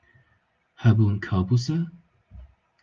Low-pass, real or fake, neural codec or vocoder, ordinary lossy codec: 7.2 kHz; real; none; Opus, 24 kbps